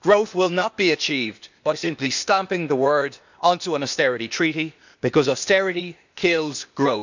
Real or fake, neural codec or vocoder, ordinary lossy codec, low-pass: fake; codec, 16 kHz, 0.8 kbps, ZipCodec; none; 7.2 kHz